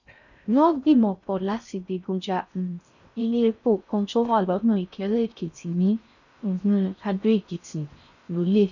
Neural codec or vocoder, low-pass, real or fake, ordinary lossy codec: codec, 16 kHz in and 24 kHz out, 0.6 kbps, FocalCodec, streaming, 4096 codes; 7.2 kHz; fake; none